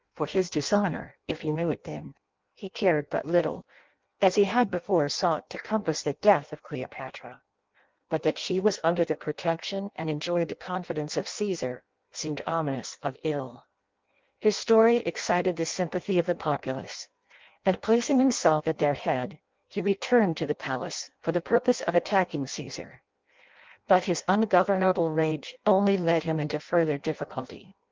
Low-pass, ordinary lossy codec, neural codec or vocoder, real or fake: 7.2 kHz; Opus, 32 kbps; codec, 16 kHz in and 24 kHz out, 0.6 kbps, FireRedTTS-2 codec; fake